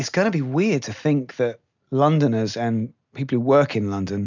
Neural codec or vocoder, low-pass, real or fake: none; 7.2 kHz; real